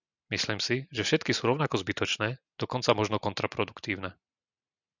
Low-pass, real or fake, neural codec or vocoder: 7.2 kHz; real; none